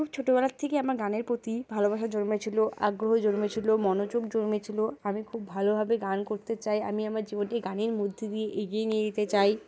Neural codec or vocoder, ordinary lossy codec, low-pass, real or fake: none; none; none; real